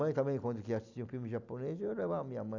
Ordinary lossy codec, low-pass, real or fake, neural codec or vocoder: none; 7.2 kHz; real; none